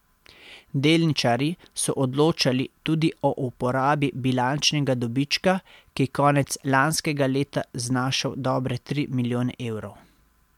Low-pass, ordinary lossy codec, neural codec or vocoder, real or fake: 19.8 kHz; MP3, 96 kbps; none; real